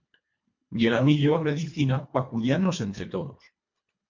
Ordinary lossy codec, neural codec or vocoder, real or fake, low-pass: MP3, 48 kbps; codec, 24 kHz, 1.5 kbps, HILCodec; fake; 7.2 kHz